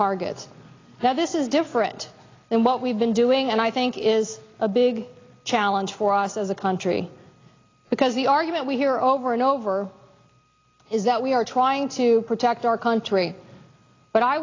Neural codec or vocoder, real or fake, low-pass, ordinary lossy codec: none; real; 7.2 kHz; AAC, 32 kbps